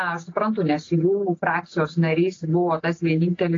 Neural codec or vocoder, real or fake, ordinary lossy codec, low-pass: none; real; AAC, 32 kbps; 7.2 kHz